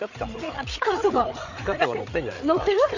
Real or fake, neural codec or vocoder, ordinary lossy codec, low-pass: fake; codec, 16 kHz, 8 kbps, FreqCodec, larger model; none; 7.2 kHz